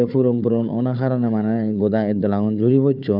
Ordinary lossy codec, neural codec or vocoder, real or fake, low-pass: none; codec, 16 kHz, 4 kbps, FunCodec, trained on Chinese and English, 50 frames a second; fake; 5.4 kHz